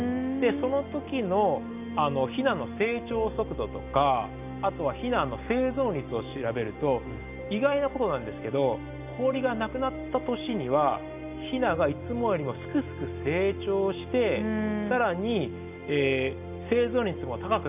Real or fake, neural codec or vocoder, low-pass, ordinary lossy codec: real; none; 3.6 kHz; none